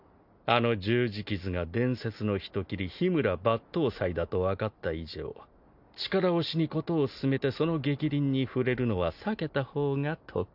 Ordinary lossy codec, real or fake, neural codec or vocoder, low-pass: none; real; none; 5.4 kHz